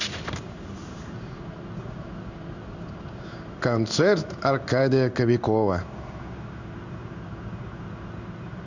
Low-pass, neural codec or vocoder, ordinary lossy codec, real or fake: 7.2 kHz; codec, 16 kHz in and 24 kHz out, 1 kbps, XY-Tokenizer; none; fake